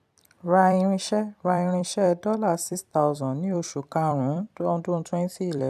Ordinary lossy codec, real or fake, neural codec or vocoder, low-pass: none; fake; vocoder, 44.1 kHz, 128 mel bands every 256 samples, BigVGAN v2; 14.4 kHz